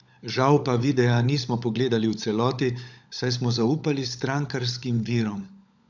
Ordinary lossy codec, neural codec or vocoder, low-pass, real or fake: none; codec, 16 kHz, 16 kbps, FunCodec, trained on LibriTTS, 50 frames a second; 7.2 kHz; fake